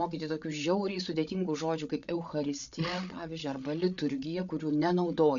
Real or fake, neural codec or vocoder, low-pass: fake; codec, 16 kHz, 8 kbps, FreqCodec, larger model; 7.2 kHz